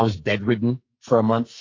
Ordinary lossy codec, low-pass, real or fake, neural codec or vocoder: AAC, 32 kbps; 7.2 kHz; fake; codec, 44.1 kHz, 2.6 kbps, SNAC